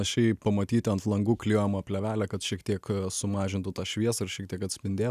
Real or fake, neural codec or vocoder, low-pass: real; none; 14.4 kHz